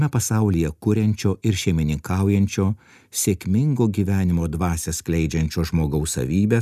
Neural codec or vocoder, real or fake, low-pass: none; real; 14.4 kHz